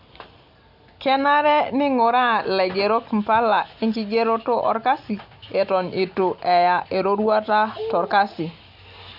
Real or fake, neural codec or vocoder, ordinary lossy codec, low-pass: real; none; none; 5.4 kHz